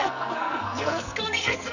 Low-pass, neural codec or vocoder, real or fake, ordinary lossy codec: 7.2 kHz; codec, 44.1 kHz, 2.6 kbps, SNAC; fake; none